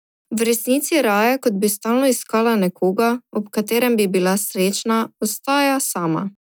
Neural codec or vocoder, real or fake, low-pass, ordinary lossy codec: none; real; none; none